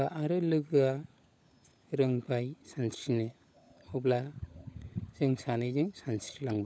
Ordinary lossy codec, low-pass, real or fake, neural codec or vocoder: none; none; fake; codec, 16 kHz, 16 kbps, FunCodec, trained on LibriTTS, 50 frames a second